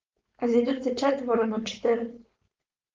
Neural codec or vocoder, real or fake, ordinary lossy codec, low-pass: codec, 16 kHz, 4.8 kbps, FACodec; fake; Opus, 24 kbps; 7.2 kHz